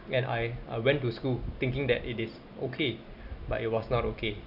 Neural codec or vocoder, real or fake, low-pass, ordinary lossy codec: none; real; 5.4 kHz; none